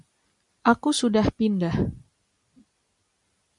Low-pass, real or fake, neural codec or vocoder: 10.8 kHz; real; none